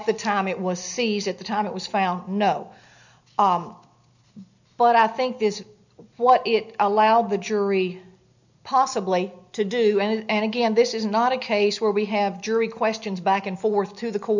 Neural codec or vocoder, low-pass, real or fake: none; 7.2 kHz; real